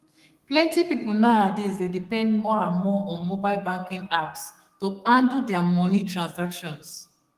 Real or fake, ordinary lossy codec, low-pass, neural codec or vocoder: fake; Opus, 24 kbps; 14.4 kHz; codec, 32 kHz, 1.9 kbps, SNAC